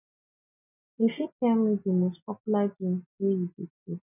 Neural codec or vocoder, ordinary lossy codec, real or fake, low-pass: none; none; real; 3.6 kHz